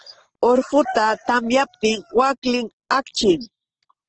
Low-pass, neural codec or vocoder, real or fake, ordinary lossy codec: 7.2 kHz; none; real; Opus, 16 kbps